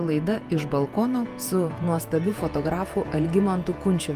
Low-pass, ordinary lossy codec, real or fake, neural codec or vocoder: 14.4 kHz; Opus, 32 kbps; fake; vocoder, 48 kHz, 128 mel bands, Vocos